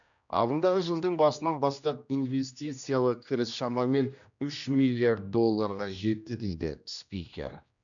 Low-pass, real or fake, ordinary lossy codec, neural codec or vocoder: 7.2 kHz; fake; none; codec, 16 kHz, 1 kbps, X-Codec, HuBERT features, trained on general audio